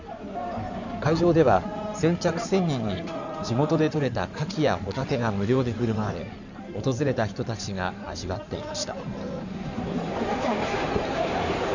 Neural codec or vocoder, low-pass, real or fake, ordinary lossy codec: codec, 16 kHz in and 24 kHz out, 2.2 kbps, FireRedTTS-2 codec; 7.2 kHz; fake; none